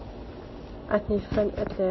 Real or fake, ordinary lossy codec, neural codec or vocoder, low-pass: real; MP3, 24 kbps; none; 7.2 kHz